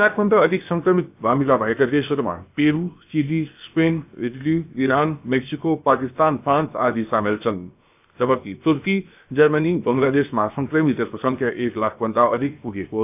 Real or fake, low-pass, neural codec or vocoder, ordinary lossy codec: fake; 3.6 kHz; codec, 16 kHz, 0.7 kbps, FocalCodec; AAC, 32 kbps